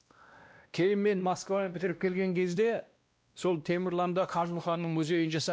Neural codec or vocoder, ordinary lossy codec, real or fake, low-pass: codec, 16 kHz, 1 kbps, X-Codec, WavLM features, trained on Multilingual LibriSpeech; none; fake; none